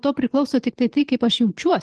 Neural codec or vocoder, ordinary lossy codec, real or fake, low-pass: vocoder, 44.1 kHz, 128 mel bands every 512 samples, BigVGAN v2; Opus, 16 kbps; fake; 10.8 kHz